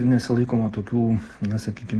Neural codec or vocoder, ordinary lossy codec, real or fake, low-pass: none; Opus, 16 kbps; real; 10.8 kHz